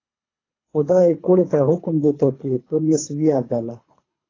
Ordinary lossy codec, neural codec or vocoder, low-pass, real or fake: AAC, 32 kbps; codec, 24 kHz, 3 kbps, HILCodec; 7.2 kHz; fake